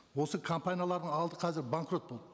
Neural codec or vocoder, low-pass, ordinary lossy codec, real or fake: none; none; none; real